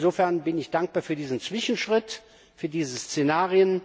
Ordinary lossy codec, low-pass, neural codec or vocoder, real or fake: none; none; none; real